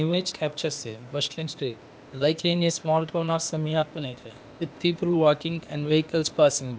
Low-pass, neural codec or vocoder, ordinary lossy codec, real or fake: none; codec, 16 kHz, 0.8 kbps, ZipCodec; none; fake